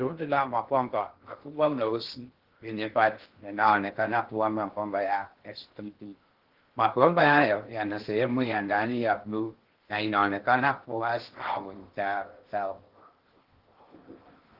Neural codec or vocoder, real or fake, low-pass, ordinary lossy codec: codec, 16 kHz in and 24 kHz out, 0.6 kbps, FocalCodec, streaming, 2048 codes; fake; 5.4 kHz; Opus, 16 kbps